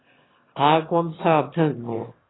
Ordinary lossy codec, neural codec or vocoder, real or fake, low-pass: AAC, 16 kbps; autoencoder, 22.05 kHz, a latent of 192 numbers a frame, VITS, trained on one speaker; fake; 7.2 kHz